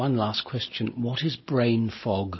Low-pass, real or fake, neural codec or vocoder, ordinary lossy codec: 7.2 kHz; real; none; MP3, 24 kbps